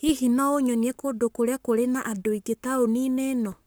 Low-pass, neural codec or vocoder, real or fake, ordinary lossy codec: none; codec, 44.1 kHz, 7.8 kbps, Pupu-Codec; fake; none